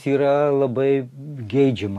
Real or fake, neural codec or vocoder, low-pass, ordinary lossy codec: real; none; 14.4 kHz; MP3, 64 kbps